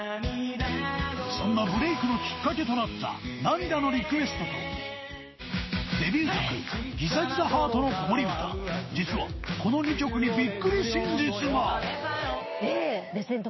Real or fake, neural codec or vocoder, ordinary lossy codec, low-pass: fake; codec, 16 kHz, 6 kbps, DAC; MP3, 24 kbps; 7.2 kHz